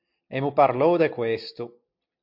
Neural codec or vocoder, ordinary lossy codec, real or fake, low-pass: none; MP3, 48 kbps; real; 5.4 kHz